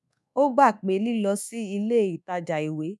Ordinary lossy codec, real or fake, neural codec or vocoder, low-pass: none; fake; codec, 24 kHz, 1.2 kbps, DualCodec; none